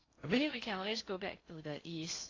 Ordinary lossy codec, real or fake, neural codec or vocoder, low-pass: AAC, 32 kbps; fake; codec, 16 kHz in and 24 kHz out, 0.6 kbps, FocalCodec, streaming, 4096 codes; 7.2 kHz